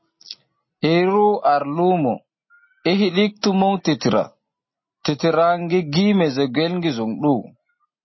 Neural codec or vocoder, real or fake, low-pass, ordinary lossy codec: none; real; 7.2 kHz; MP3, 24 kbps